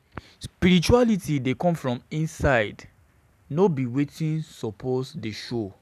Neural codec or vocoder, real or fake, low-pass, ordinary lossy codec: none; real; 14.4 kHz; none